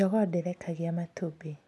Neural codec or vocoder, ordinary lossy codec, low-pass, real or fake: none; none; none; real